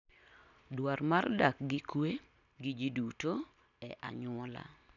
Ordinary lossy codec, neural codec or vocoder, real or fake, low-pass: none; none; real; 7.2 kHz